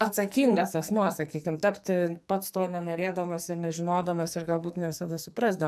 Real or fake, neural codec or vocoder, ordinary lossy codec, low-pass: fake; codec, 44.1 kHz, 2.6 kbps, SNAC; AAC, 96 kbps; 14.4 kHz